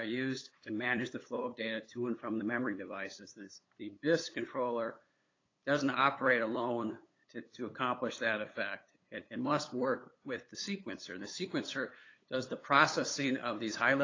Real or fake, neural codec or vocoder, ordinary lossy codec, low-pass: fake; codec, 16 kHz, 8 kbps, FunCodec, trained on LibriTTS, 25 frames a second; AAC, 32 kbps; 7.2 kHz